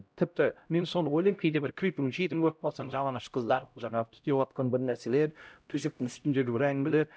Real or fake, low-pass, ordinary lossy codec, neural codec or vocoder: fake; none; none; codec, 16 kHz, 0.5 kbps, X-Codec, HuBERT features, trained on LibriSpeech